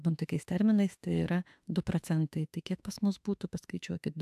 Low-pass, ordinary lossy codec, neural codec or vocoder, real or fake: 14.4 kHz; AAC, 96 kbps; autoencoder, 48 kHz, 32 numbers a frame, DAC-VAE, trained on Japanese speech; fake